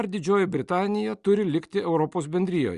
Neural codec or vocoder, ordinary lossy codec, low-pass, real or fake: none; Opus, 64 kbps; 10.8 kHz; real